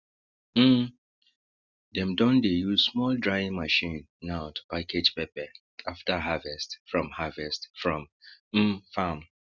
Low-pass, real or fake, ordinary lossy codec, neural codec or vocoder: 7.2 kHz; real; Opus, 64 kbps; none